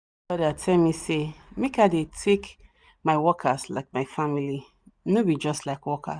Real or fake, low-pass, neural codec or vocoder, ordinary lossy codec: real; 9.9 kHz; none; Opus, 64 kbps